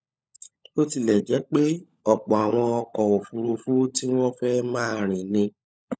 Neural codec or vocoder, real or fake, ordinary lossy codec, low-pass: codec, 16 kHz, 16 kbps, FunCodec, trained on LibriTTS, 50 frames a second; fake; none; none